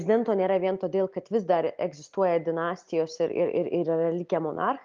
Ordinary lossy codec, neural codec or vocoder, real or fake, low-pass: Opus, 24 kbps; none; real; 7.2 kHz